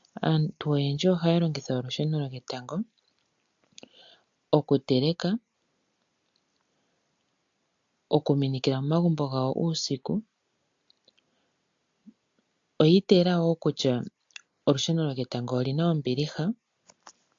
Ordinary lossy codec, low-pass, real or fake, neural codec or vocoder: AAC, 64 kbps; 7.2 kHz; real; none